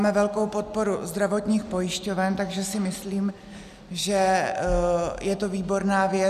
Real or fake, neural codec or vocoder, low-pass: real; none; 14.4 kHz